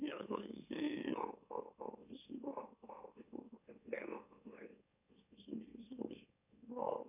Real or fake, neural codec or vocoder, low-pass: fake; autoencoder, 44.1 kHz, a latent of 192 numbers a frame, MeloTTS; 3.6 kHz